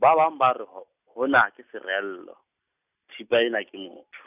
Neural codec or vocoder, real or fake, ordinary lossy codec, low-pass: none; real; none; 3.6 kHz